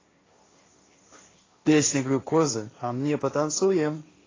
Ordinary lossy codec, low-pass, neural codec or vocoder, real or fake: AAC, 32 kbps; 7.2 kHz; codec, 16 kHz, 1.1 kbps, Voila-Tokenizer; fake